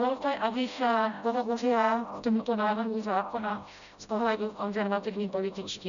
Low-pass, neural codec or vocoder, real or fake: 7.2 kHz; codec, 16 kHz, 0.5 kbps, FreqCodec, smaller model; fake